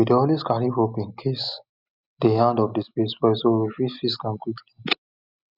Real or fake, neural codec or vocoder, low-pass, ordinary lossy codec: real; none; 5.4 kHz; none